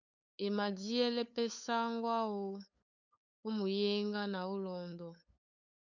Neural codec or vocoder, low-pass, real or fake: codec, 16 kHz, 8 kbps, FunCodec, trained on LibriTTS, 25 frames a second; 7.2 kHz; fake